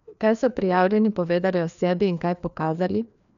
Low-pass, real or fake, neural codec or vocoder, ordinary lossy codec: 7.2 kHz; fake; codec, 16 kHz, 2 kbps, FreqCodec, larger model; none